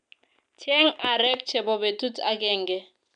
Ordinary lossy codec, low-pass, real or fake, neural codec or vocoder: none; 9.9 kHz; real; none